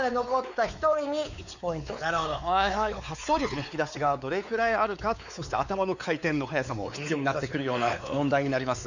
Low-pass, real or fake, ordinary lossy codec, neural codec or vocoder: 7.2 kHz; fake; none; codec, 16 kHz, 4 kbps, X-Codec, WavLM features, trained on Multilingual LibriSpeech